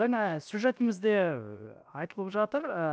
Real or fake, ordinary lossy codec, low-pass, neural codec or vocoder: fake; none; none; codec, 16 kHz, 0.7 kbps, FocalCodec